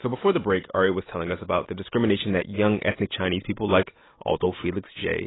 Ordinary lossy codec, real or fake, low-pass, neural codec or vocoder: AAC, 16 kbps; real; 7.2 kHz; none